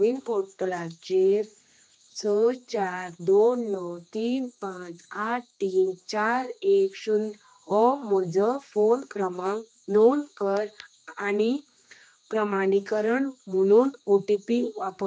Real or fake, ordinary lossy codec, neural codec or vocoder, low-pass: fake; none; codec, 16 kHz, 2 kbps, X-Codec, HuBERT features, trained on general audio; none